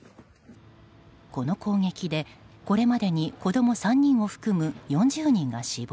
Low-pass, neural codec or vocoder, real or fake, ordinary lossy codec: none; none; real; none